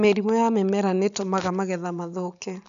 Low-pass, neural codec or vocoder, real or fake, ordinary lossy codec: 7.2 kHz; none; real; MP3, 96 kbps